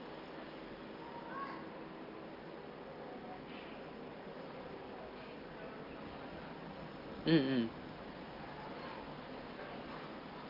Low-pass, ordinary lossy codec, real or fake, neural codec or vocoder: 5.4 kHz; Opus, 24 kbps; real; none